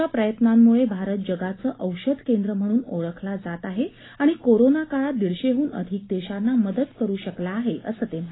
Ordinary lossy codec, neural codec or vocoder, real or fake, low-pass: AAC, 16 kbps; none; real; 7.2 kHz